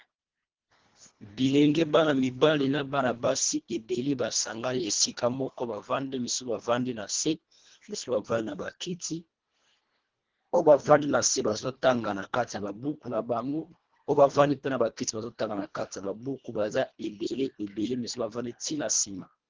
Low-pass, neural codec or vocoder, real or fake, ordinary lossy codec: 7.2 kHz; codec, 24 kHz, 1.5 kbps, HILCodec; fake; Opus, 16 kbps